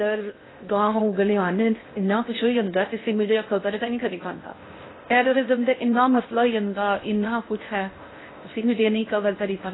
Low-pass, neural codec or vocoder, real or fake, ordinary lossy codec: 7.2 kHz; codec, 16 kHz in and 24 kHz out, 0.6 kbps, FocalCodec, streaming, 2048 codes; fake; AAC, 16 kbps